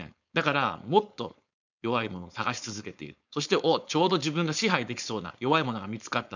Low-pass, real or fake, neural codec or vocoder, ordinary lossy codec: 7.2 kHz; fake; codec, 16 kHz, 4.8 kbps, FACodec; none